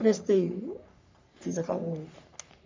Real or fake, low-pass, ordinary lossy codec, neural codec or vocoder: fake; 7.2 kHz; MP3, 64 kbps; codec, 44.1 kHz, 3.4 kbps, Pupu-Codec